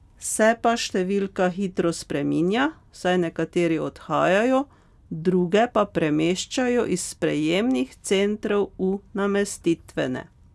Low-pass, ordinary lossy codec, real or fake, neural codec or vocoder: none; none; real; none